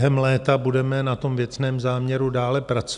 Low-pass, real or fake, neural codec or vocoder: 10.8 kHz; real; none